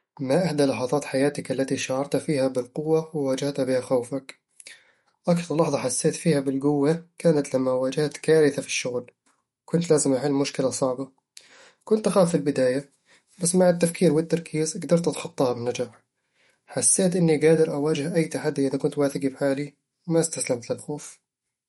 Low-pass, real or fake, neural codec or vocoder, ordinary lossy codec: 19.8 kHz; fake; autoencoder, 48 kHz, 128 numbers a frame, DAC-VAE, trained on Japanese speech; MP3, 48 kbps